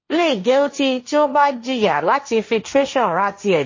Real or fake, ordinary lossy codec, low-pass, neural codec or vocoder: fake; MP3, 32 kbps; 7.2 kHz; codec, 16 kHz, 1.1 kbps, Voila-Tokenizer